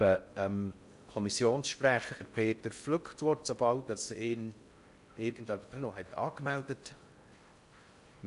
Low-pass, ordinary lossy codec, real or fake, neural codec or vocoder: 10.8 kHz; AAC, 96 kbps; fake; codec, 16 kHz in and 24 kHz out, 0.6 kbps, FocalCodec, streaming, 4096 codes